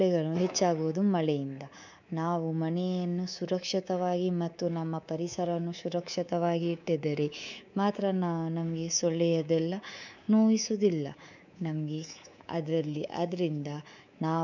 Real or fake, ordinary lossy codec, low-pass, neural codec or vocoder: fake; none; 7.2 kHz; codec, 24 kHz, 3.1 kbps, DualCodec